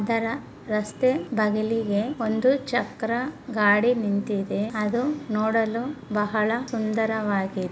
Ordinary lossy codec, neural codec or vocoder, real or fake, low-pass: none; none; real; none